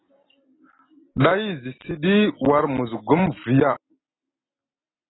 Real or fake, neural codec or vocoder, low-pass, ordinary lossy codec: real; none; 7.2 kHz; AAC, 16 kbps